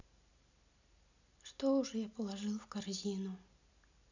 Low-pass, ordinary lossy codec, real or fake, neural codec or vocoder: 7.2 kHz; none; real; none